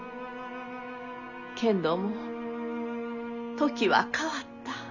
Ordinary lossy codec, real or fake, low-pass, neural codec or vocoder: none; real; 7.2 kHz; none